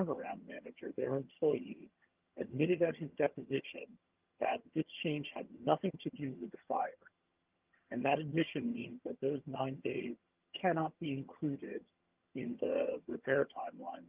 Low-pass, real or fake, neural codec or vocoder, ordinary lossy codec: 3.6 kHz; fake; vocoder, 22.05 kHz, 80 mel bands, HiFi-GAN; Opus, 32 kbps